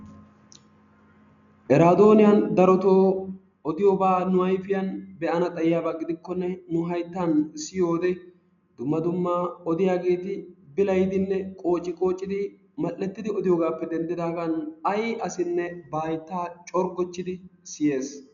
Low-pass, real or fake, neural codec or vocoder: 7.2 kHz; real; none